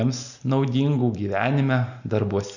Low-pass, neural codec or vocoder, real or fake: 7.2 kHz; none; real